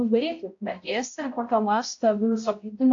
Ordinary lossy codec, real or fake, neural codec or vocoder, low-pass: AAC, 48 kbps; fake; codec, 16 kHz, 0.5 kbps, X-Codec, HuBERT features, trained on balanced general audio; 7.2 kHz